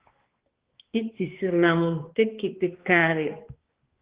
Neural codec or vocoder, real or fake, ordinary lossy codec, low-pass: codec, 16 kHz, 2 kbps, X-Codec, HuBERT features, trained on balanced general audio; fake; Opus, 16 kbps; 3.6 kHz